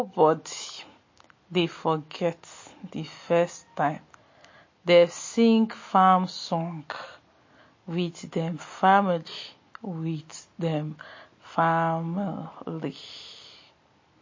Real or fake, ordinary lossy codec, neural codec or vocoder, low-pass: real; MP3, 32 kbps; none; 7.2 kHz